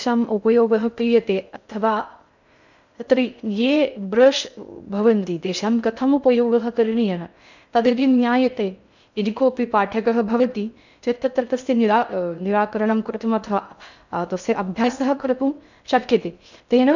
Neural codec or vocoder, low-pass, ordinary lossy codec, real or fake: codec, 16 kHz in and 24 kHz out, 0.6 kbps, FocalCodec, streaming, 2048 codes; 7.2 kHz; none; fake